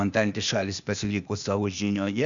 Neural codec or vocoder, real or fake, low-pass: codec, 16 kHz, 0.8 kbps, ZipCodec; fake; 7.2 kHz